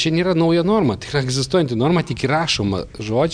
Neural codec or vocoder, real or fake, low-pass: none; real; 9.9 kHz